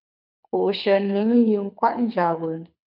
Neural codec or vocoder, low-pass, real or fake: codec, 24 kHz, 6 kbps, HILCodec; 5.4 kHz; fake